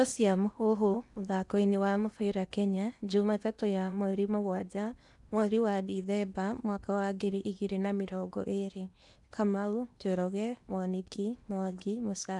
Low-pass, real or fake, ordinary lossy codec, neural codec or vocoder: 10.8 kHz; fake; none; codec, 16 kHz in and 24 kHz out, 0.8 kbps, FocalCodec, streaming, 65536 codes